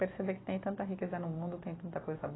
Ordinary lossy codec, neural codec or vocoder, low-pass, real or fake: AAC, 16 kbps; none; 7.2 kHz; real